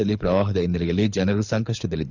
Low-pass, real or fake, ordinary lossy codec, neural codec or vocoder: 7.2 kHz; fake; none; codec, 24 kHz, 6 kbps, HILCodec